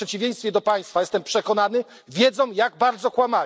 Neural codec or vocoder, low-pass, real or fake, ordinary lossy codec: none; none; real; none